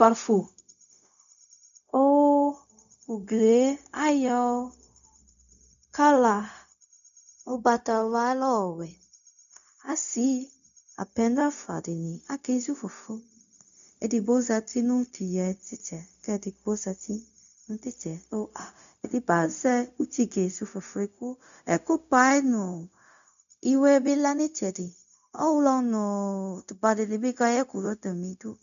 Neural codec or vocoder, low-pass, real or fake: codec, 16 kHz, 0.4 kbps, LongCat-Audio-Codec; 7.2 kHz; fake